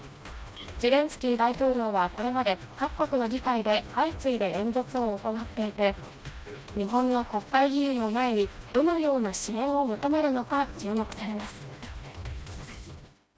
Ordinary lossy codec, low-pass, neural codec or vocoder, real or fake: none; none; codec, 16 kHz, 1 kbps, FreqCodec, smaller model; fake